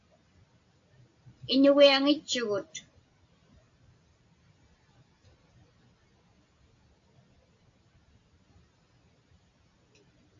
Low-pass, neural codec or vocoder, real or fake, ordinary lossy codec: 7.2 kHz; none; real; AAC, 48 kbps